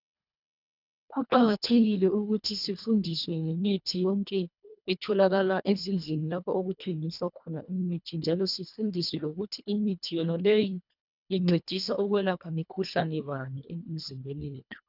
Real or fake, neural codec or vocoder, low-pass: fake; codec, 24 kHz, 1.5 kbps, HILCodec; 5.4 kHz